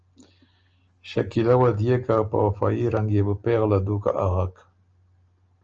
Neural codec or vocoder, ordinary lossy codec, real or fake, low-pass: none; Opus, 24 kbps; real; 7.2 kHz